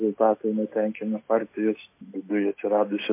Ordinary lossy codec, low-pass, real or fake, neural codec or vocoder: MP3, 24 kbps; 3.6 kHz; real; none